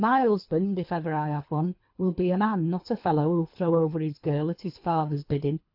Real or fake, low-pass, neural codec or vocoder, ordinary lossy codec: fake; 5.4 kHz; codec, 24 kHz, 3 kbps, HILCodec; AAC, 48 kbps